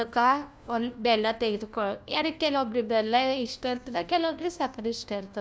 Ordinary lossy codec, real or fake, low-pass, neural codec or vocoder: none; fake; none; codec, 16 kHz, 1 kbps, FunCodec, trained on LibriTTS, 50 frames a second